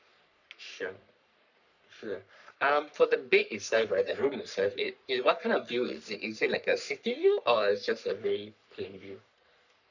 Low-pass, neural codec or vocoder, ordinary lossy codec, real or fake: 7.2 kHz; codec, 44.1 kHz, 3.4 kbps, Pupu-Codec; none; fake